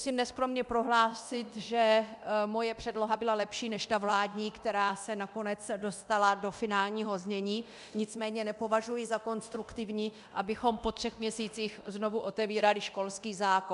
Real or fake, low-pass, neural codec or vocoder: fake; 10.8 kHz; codec, 24 kHz, 0.9 kbps, DualCodec